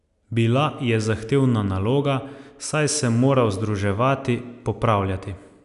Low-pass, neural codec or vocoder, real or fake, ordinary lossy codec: 10.8 kHz; none; real; none